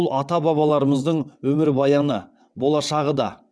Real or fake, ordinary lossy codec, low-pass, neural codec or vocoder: fake; none; none; vocoder, 22.05 kHz, 80 mel bands, WaveNeXt